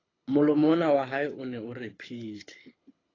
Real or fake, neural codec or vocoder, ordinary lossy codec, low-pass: fake; codec, 24 kHz, 6 kbps, HILCodec; AAC, 32 kbps; 7.2 kHz